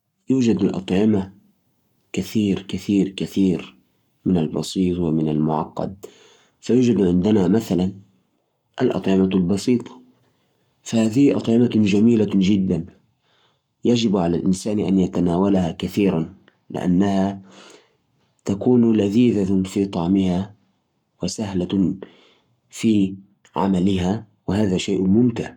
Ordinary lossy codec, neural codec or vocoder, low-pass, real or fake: none; codec, 44.1 kHz, 7.8 kbps, Pupu-Codec; 19.8 kHz; fake